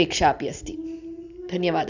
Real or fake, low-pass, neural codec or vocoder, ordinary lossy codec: real; 7.2 kHz; none; none